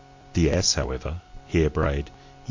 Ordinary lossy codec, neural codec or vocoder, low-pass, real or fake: MP3, 48 kbps; none; 7.2 kHz; real